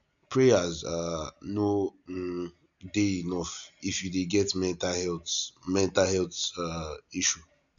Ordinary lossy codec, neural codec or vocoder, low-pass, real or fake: none; none; 7.2 kHz; real